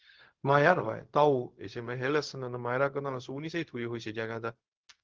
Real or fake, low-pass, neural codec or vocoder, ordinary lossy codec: fake; 7.2 kHz; codec, 16 kHz, 0.4 kbps, LongCat-Audio-Codec; Opus, 16 kbps